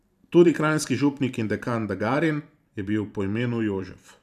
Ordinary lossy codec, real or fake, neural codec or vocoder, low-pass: none; fake; vocoder, 44.1 kHz, 128 mel bands every 512 samples, BigVGAN v2; 14.4 kHz